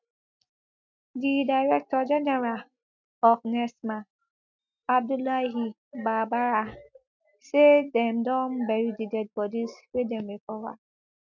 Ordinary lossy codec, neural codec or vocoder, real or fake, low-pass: MP3, 64 kbps; none; real; 7.2 kHz